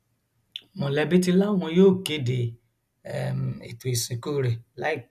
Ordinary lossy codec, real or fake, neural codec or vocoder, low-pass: none; real; none; 14.4 kHz